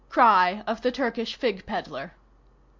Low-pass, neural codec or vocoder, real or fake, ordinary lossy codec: 7.2 kHz; none; real; MP3, 64 kbps